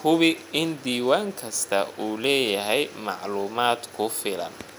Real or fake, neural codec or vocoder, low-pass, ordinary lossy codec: real; none; none; none